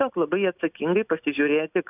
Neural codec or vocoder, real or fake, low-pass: none; real; 3.6 kHz